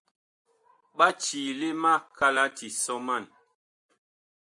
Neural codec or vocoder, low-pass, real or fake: none; 10.8 kHz; real